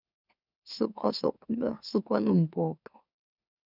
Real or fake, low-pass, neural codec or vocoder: fake; 5.4 kHz; autoencoder, 44.1 kHz, a latent of 192 numbers a frame, MeloTTS